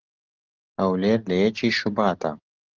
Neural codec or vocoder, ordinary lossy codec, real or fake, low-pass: none; Opus, 16 kbps; real; 7.2 kHz